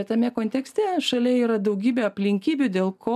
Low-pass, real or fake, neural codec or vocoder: 14.4 kHz; real; none